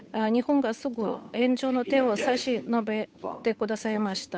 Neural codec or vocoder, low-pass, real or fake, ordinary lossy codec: codec, 16 kHz, 8 kbps, FunCodec, trained on Chinese and English, 25 frames a second; none; fake; none